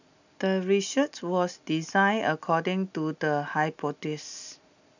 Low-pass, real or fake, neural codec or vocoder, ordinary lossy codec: 7.2 kHz; real; none; none